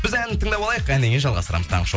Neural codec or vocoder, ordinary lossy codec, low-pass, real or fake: none; none; none; real